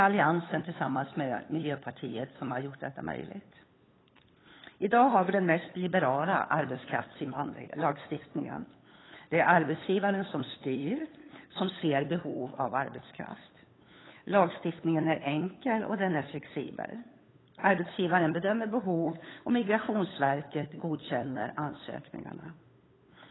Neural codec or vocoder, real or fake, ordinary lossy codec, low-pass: codec, 16 kHz, 8 kbps, FunCodec, trained on LibriTTS, 25 frames a second; fake; AAC, 16 kbps; 7.2 kHz